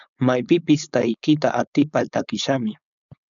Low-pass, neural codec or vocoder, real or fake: 7.2 kHz; codec, 16 kHz, 4.8 kbps, FACodec; fake